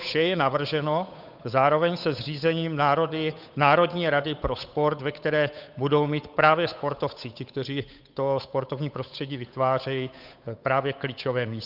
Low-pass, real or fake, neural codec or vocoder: 5.4 kHz; fake; codec, 16 kHz, 8 kbps, FunCodec, trained on Chinese and English, 25 frames a second